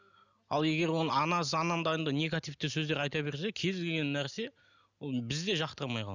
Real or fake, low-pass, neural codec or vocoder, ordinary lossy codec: real; 7.2 kHz; none; none